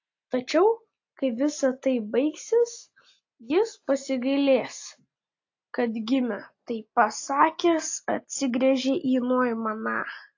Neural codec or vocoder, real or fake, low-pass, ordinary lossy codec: none; real; 7.2 kHz; AAC, 48 kbps